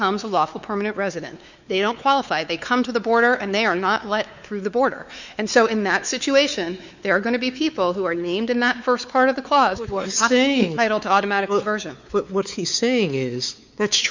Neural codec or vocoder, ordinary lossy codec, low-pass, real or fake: codec, 16 kHz, 4 kbps, X-Codec, WavLM features, trained on Multilingual LibriSpeech; Opus, 64 kbps; 7.2 kHz; fake